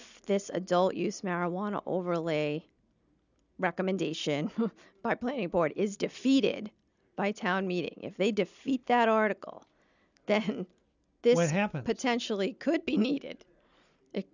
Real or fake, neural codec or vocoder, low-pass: real; none; 7.2 kHz